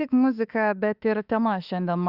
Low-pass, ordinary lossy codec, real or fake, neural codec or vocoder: 5.4 kHz; Opus, 64 kbps; fake; autoencoder, 48 kHz, 32 numbers a frame, DAC-VAE, trained on Japanese speech